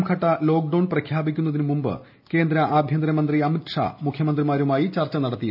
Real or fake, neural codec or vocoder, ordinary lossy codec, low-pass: real; none; none; 5.4 kHz